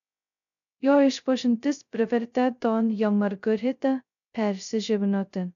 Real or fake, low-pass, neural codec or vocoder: fake; 7.2 kHz; codec, 16 kHz, 0.2 kbps, FocalCodec